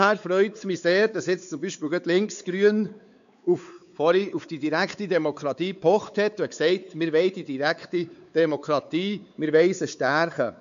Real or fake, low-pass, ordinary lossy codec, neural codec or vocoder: fake; 7.2 kHz; none; codec, 16 kHz, 4 kbps, X-Codec, WavLM features, trained on Multilingual LibriSpeech